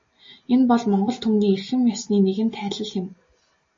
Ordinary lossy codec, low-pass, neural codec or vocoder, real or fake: MP3, 32 kbps; 7.2 kHz; none; real